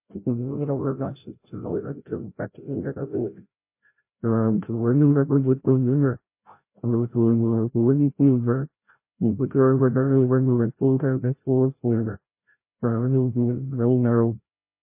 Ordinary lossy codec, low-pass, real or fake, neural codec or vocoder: MP3, 24 kbps; 3.6 kHz; fake; codec, 16 kHz, 0.5 kbps, FreqCodec, larger model